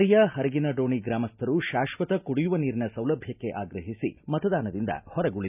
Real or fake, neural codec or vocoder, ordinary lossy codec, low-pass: real; none; none; 3.6 kHz